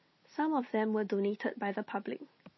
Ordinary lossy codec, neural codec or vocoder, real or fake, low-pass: MP3, 24 kbps; none; real; 7.2 kHz